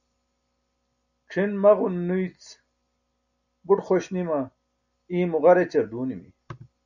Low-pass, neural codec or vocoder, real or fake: 7.2 kHz; none; real